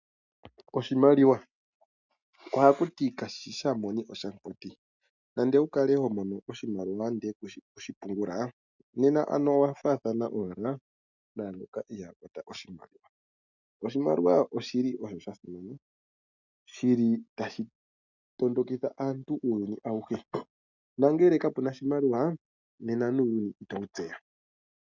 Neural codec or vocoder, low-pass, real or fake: none; 7.2 kHz; real